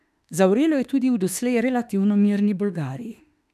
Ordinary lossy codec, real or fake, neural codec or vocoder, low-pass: none; fake; autoencoder, 48 kHz, 32 numbers a frame, DAC-VAE, trained on Japanese speech; 14.4 kHz